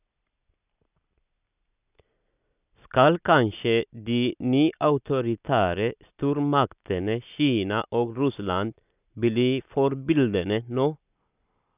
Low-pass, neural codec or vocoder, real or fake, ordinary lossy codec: 3.6 kHz; none; real; none